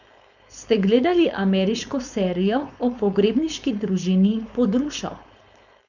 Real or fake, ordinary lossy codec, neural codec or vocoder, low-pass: fake; none; codec, 16 kHz, 4.8 kbps, FACodec; 7.2 kHz